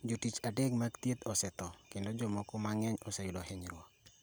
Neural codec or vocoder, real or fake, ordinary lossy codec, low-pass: none; real; none; none